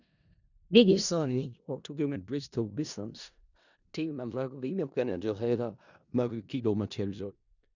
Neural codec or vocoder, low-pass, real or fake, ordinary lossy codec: codec, 16 kHz in and 24 kHz out, 0.4 kbps, LongCat-Audio-Codec, four codebook decoder; 7.2 kHz; fake; none